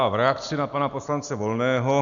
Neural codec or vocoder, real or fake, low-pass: none; real; 7.2 kHz